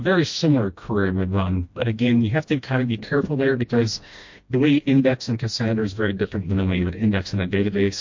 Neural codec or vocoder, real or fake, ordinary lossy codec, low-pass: codec, 16 kHz, 1 kbps, FreqCodec, smaller model; fake; MP3, 48 kbps; 7.2 kHz